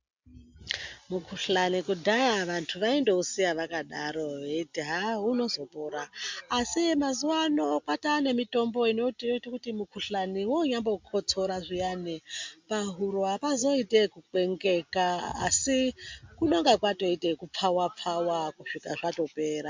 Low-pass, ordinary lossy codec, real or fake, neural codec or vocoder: 7.2 kHz; MP3, 64 kbps; real; none